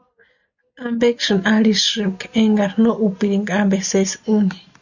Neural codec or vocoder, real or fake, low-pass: none; real; 7.2 kHz